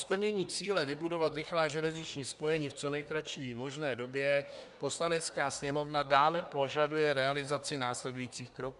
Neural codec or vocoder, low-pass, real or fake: codec, 24 kHz, 1 kbps, SNAC; 10.8 kHz; fake